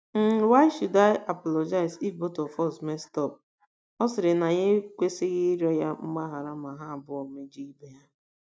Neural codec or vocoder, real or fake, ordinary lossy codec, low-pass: none; real; none; none